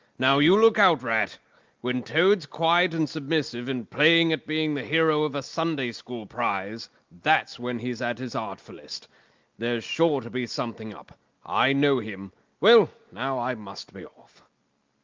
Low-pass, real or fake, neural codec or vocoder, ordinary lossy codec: 7.2 kHz; real; none; Opus, 24 kbps